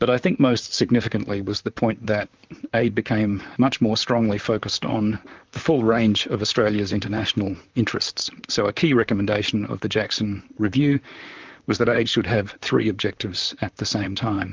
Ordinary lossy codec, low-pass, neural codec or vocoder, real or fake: Opus, 24 kbps; 7.2 kHz; vocoder, 44.1 kHz, 128 mel bands, Pupu-Vocoder; fake